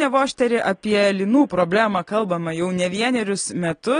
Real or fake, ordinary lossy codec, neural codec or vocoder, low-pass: real; AAC, 32 kbps; none; 9.9 kHz